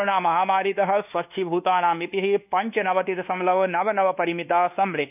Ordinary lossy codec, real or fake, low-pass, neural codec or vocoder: none; fake; 3.6 kHz; codec, 24 kHz, 1.2 kbps, DualCodec